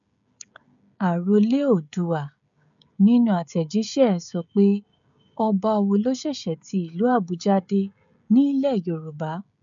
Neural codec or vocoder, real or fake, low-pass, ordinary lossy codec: codec, 16 kHz, 16 kbps, FreqCodec, smaller model; fake; 7.2 kHz; MP3, 64 kbps